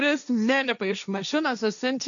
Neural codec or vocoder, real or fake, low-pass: codec, 16 kHz, 1.1 kbps, Voila-Tokenizer; fake; 7.2 kHz